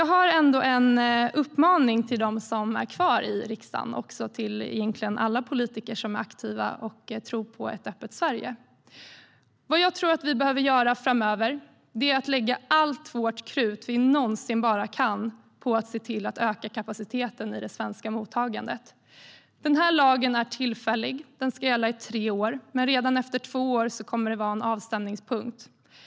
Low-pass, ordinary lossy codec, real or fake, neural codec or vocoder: none; none; real; none